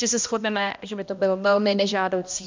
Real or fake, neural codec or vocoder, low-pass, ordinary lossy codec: fake; codec, 16 kHz, 1 kbps, X-Codec, HuBERT features, trained on balanced general audio; 7.2 kHz; MP3, 64 kbps